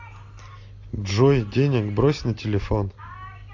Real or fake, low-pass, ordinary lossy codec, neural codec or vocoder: real; 7.2 kHz; AAC, 48 kbps; none